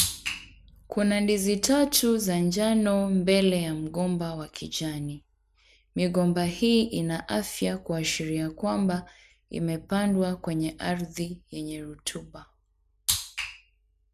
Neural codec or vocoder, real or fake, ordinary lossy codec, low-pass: none; real; none; 14.4 kHz